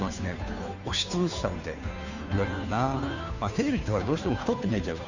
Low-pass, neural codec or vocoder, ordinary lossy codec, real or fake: 7.2 kHz; codec, 16 kHz, 2 kbps, FunCodec, trained on Chinese and English, 25 frames a second; none; fake